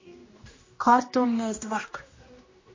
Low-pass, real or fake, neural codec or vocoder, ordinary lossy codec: 7.2 kHz; fake; codec, 16 kHz, 1 kbps, X-Codec, HuBERT features, trained on general audio; MP3, 32 kbps